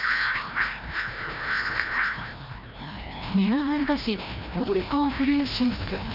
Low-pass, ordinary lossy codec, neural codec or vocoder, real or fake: 5.4 kHz; none; codec, 16 kHz, 1 kbps, FreqCodec, larger model; fake